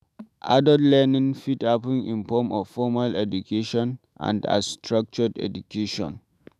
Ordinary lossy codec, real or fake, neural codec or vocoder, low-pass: none; fake; autoencoder, 48 kHz, 128 numbers a frame, DAC-VAE, trained on Japanese speech; 14.4 kHz